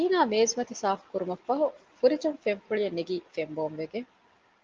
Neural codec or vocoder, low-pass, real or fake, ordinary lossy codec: none; 7.2 kHz; real; Opus, 24 kbps